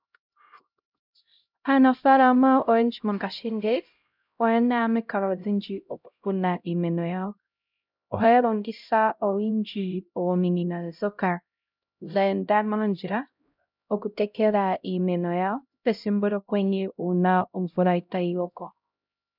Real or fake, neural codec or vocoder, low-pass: fake; codec, 16 kHz, 0.5 kbps, X-Codec, HuBERT features, trained on LibriSpeech; 5.4 kHz